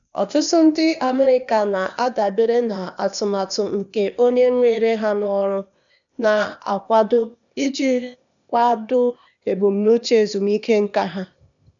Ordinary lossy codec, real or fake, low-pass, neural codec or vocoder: none; fake; 7.2 kHz; codec, 16 kHz, 0.8 kbps, ZipCodec